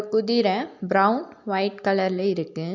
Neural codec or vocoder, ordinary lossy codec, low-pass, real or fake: none; none; 7.2 kHz; real